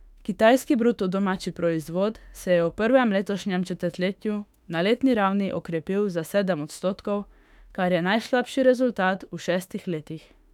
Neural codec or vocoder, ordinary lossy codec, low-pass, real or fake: autoencoder, 48 kHz, 32 numbers a frame, DAC-VAE, trained on Japanese speech; none; 19.8 kHz; fake